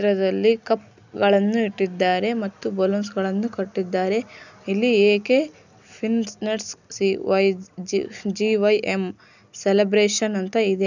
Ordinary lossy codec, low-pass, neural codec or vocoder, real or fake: none; 7.2 kHz; none; real